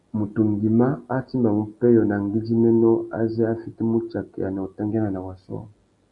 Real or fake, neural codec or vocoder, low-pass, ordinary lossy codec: real; none; 10.8 kHz; Opus, 64 kbps